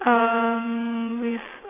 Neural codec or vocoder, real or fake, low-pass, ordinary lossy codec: vocoder, 22.05 kHz, 80 mel bands, WaveNeXt; fake; 3.6 kHz; AAC, 16 kbps